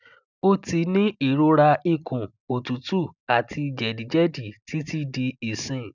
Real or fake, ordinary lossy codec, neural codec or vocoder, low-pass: real; none; none; 7.2 kHz